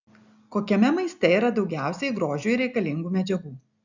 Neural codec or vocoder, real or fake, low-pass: none; real; 7.2 kHz